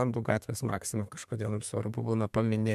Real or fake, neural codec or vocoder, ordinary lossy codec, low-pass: fake; codec, 32 kHz, 1.9 kbps, SNAC; MP3, 96 kbps; 14.4 kHz